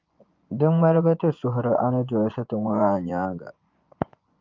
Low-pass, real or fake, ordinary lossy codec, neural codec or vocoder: 7.2 kHz; fake; Opus, 24 kbps; vocoder, 24 kHz, 100 mel bands, Vocos